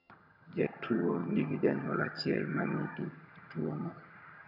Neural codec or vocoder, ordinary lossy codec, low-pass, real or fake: vocoder, 22.05 kHz, 80 mel bands, HiFi-GAN; none; 5.4 kHz; fake